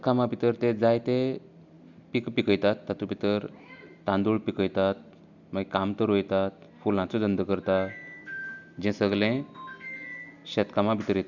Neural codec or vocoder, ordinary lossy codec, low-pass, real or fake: none; Opus, 64 kbps; 7.2 kHz; real